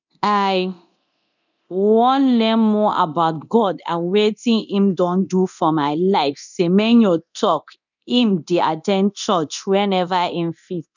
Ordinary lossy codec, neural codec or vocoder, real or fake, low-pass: none; codec, 24 kHz, 0.9 kbps, DualCodec; fake; 7.2 kHz